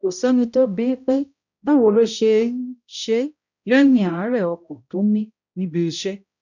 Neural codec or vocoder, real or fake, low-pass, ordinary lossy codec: codec, 16 kHz, 0.5 kbps, X-Codec, HuBERT features, trained on balanced general audio; fake; 7.2 kHz; none